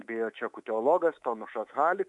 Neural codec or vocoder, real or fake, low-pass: codec, 24 kHz, 3.1 kbps, DualCodec; fake; 10.8 kHz